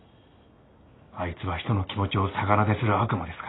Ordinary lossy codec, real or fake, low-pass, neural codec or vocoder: AAC, 16 kbps; real; 7.2 kHz; none